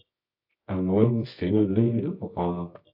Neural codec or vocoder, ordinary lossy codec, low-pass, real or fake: codec, 24 kHz, 0.9 kbps, WavTokenizer, medium music audio release; AAC, 48 kbps; 5.4 kHz; fake